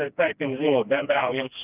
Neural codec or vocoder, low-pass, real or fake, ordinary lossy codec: codec, 16 kHz, 1 kbps, FreqCodec, smaller model; 3.6 kHz; fake; Opus, 32 kbps